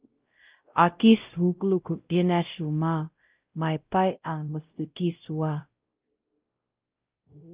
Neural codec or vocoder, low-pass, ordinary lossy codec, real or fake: codec, 16 kHz, 0.5 kbps, X-Codec, WavLM features, trained on Multilingual LibriSpeech; 3.6 kHz; Opus, 32 kbps; fake